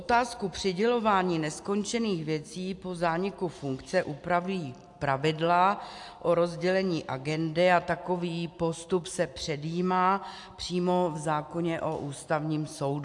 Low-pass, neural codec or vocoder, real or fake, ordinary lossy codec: 10.8 kHz; none; real; AAC, 64 kbps